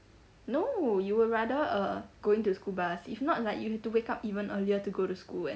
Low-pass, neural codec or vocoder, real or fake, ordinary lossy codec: none; none; real; none